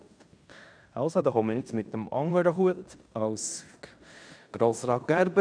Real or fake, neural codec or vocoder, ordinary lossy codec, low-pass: fake; codec, 16 kHz in and 24 kHz out, 0.9 kbps, LongCat-Audio-Codec, fine tuned four codebook decoder; none; 9.9 kHz